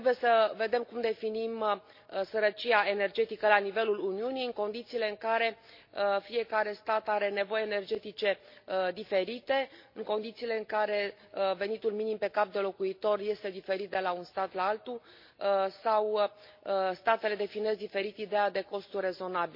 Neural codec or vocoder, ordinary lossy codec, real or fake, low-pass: none; MP3, 32 kbps; real; 5.4 kHz